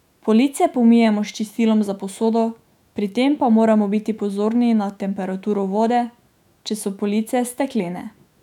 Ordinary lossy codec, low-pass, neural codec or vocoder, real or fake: none; 19.8 kHz; autoencoder, 48 kHz, 128 numbers a frame, DAC-VAE, trained on Japanese speech; fake